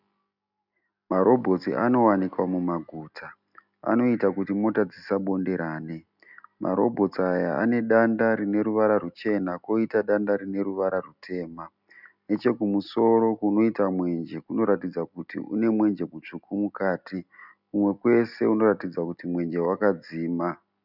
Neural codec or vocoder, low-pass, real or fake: none; 5.4 kHz; real